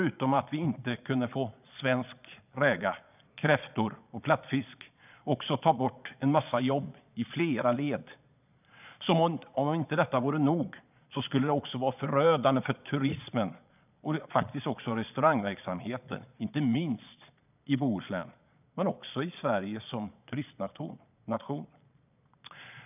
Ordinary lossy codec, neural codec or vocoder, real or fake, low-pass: none; vocoder, 22.05 kHz, 80 mel bands, Vocos; fake; 3.6 kHz